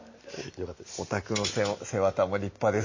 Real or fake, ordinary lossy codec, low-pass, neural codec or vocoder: real; MP3, 32 kbps; 7.2 kHz; none